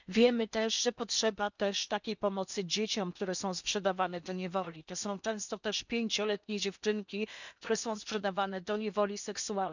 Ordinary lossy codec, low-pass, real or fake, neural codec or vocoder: none; 7.2 kHz; fake; codec, 16 kHz in and 24 kHz out, 0.8 kbps, FocalCodec, streaming, 65536 codes